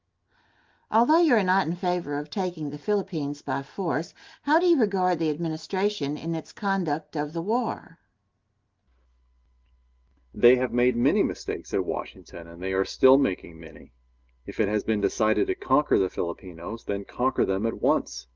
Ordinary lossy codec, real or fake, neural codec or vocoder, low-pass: Opus, 32 kbps; real; none; 7.2 kHz